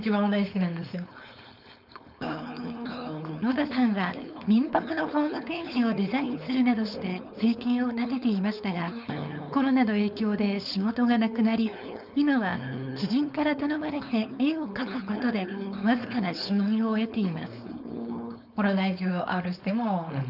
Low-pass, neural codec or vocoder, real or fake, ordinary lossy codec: 5.4 kHz; codec, 16 kHz, 4.8 kbps, FACodec; fake; none